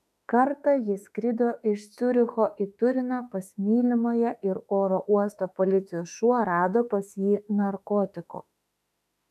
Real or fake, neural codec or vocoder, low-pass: fake; autoencoder, 48 kHz, 32 numbers a frame, DAC-VAE, trained on Japanese speech; 14.4 kHz